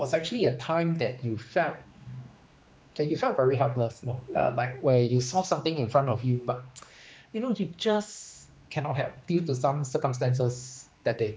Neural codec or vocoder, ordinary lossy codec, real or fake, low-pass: codec, 16 kHz, 2 kbps, X-Codec, HuBERT features, trained on general audio; none; fake; none